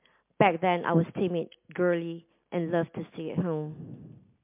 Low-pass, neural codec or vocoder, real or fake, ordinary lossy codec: 3.6 kHz; none; real; MP3, 32 kbps